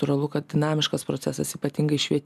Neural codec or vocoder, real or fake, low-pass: none; real; 14.4 kHz